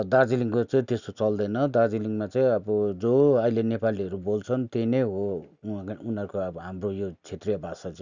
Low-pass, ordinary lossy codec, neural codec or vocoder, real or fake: 7.2 kHz; none; none; real